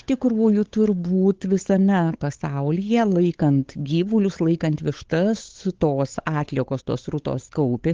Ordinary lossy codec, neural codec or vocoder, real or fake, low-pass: Opus, 16 kbps; codec, 16 kHz, 8 kbps, FunCodec, trained on Chinese and English, 25 frames a second; fake; 7.2 kHz